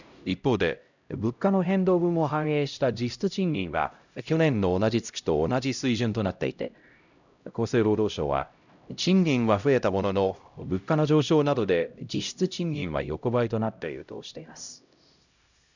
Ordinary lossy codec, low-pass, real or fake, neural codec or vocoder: none; 7.2 kHz; fake; codec, 16 kHz, 0.5 kbps, X-Codec, HuBERT features, trained on LibriSpeech